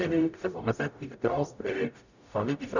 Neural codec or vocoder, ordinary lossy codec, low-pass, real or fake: codec, 44.1 kHz, 0.9 kbps, DAC; none; 7.2 kHz; fake